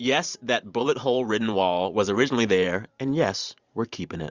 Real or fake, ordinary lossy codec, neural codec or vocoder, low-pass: fake; Opus, 64 kbps; vocoder, 44.1 kHz, 128 mel bands every 512 samples, BigVGAN v2; 7.2 kHz